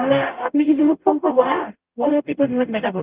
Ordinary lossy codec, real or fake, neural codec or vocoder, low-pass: Opus, 32 kbps; fake; codec, 44.1 kHz, 0.9 kbps, DAC; 3.6 kHz